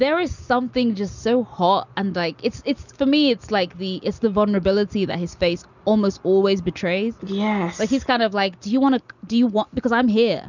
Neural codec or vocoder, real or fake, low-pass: none; real; 7.2 kHz